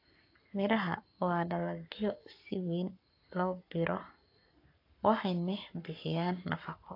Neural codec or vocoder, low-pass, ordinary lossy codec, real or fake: codec, 44.1 kHz, 7.8 kbps, Pupu-Codec; 5.4 kHz; AAC, 32 kbps; fake